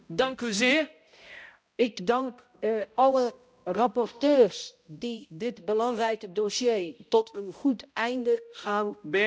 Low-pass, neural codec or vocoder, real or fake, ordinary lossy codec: none; codec, 16 kHz, 0.5 kbps, X-Codec, HuBERT features, trained on balanced general audio; fake; none